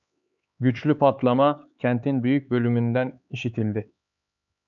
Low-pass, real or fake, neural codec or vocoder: 7.2 kHz; fake; codec, 16 kHz, 4 kbps, X-Codec, HuBERT features, trained on LibriSpeech